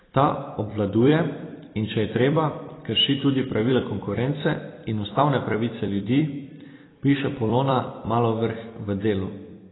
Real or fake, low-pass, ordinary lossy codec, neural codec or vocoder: fake; 7.2 kHz; AAC, 16 kbps; vocoder, 44.1 kHz, 128 mel bands every 256 samples, BigVGAN v2